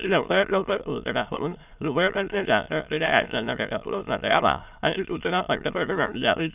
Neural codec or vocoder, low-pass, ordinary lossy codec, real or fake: autoencoder, 22.05 kHz, a latent of 192 numbers a frame, VITS, trained on many speakers; 3.6 kHz; none; fake